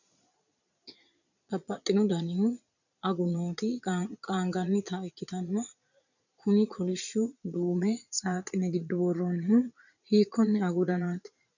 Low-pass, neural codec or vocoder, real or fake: 7.2 kHz; vocoder, 22.05 kHz, 80 mel bands, Vocos; fake